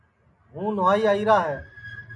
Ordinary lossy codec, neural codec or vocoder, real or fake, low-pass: MP3, 96 kbps; none; real; 10.8 kHz